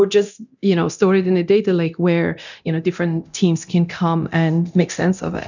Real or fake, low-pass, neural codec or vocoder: fake; 7.2 kHz; codec, 24 kHz, 0.9 kbps, DualCodec